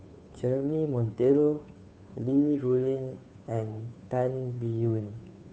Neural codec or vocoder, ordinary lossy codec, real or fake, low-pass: codec, 16 kHz, 2 kbps, FunCodec, trained on Chinese and English, 25 frames a second; none; fake; none